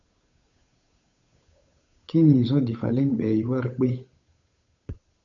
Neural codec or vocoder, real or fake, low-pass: codec, 16 kHz, 8 kbps, FunCodec, trained on Chinese and English, 25 frames a second; fake; 7.2 kHz